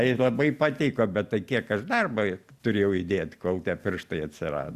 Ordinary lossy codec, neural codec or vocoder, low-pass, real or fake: Opus, 64 kbps; none; 14.4 kHz; real